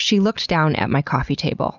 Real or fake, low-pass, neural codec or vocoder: real; 7.2 kHz; none